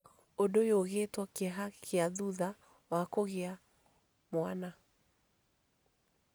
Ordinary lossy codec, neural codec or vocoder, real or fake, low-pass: none; none; real; none